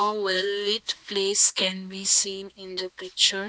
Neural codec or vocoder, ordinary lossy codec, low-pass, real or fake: codec, 16 kHz, 2 kbps, X-Codec, HuBERT features, trained on balanced general audio; none; none; fake